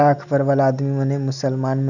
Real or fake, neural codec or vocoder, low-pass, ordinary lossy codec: real; none; 7.2 kHz; none